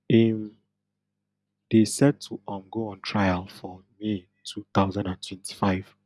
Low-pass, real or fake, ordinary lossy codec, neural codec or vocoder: none; real; none; none